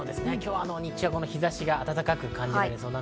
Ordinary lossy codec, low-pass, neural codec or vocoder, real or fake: none; none; none; real